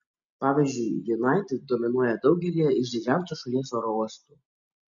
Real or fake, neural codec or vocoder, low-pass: real; none; 7.2 kHz